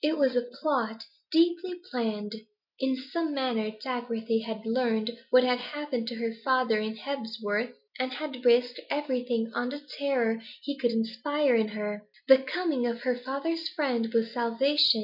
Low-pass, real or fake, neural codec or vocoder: 5.4 kHz; real; none